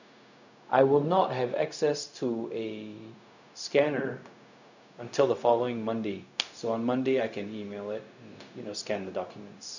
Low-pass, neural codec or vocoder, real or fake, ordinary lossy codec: 7.2 kHz; codec, 16 kHz, 0.4 kbps, LongCat-Audio-Codec; fake; none